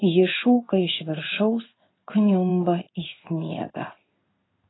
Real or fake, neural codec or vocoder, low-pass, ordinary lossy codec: fake; codec, 16 kHz in and 24 kHz out, 1 kbps, XY-Tokenizer; 7.2 kHz; AAC, 16 kbps